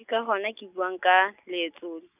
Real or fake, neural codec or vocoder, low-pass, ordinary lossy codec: real; none; 3.6 kHz; none